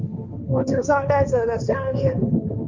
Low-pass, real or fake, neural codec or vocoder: 7.2 kHz; fake; codec, 16 kHz, 1.1 kbps, Voila-Tokenizer